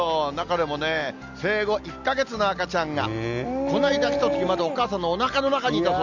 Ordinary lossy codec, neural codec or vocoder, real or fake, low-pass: none; none; real; 7.2 kHz